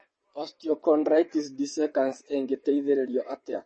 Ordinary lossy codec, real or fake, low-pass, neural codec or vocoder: MP3, 32 kbps; fake; 9.9 kHz; vocoder, 22.05 kHz, 80 mel bands, WaveNeXt